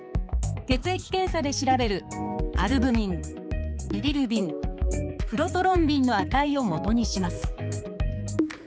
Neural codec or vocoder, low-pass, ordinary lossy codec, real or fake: codec, 16 kHz, 4 kbps, X-Codec, HuBERT features, trained on balanced general audio; none; none; fake